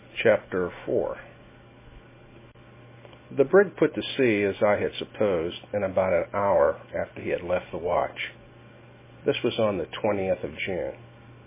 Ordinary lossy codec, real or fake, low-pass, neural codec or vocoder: MP3, 16 kbps; real; 3.6 kHz; none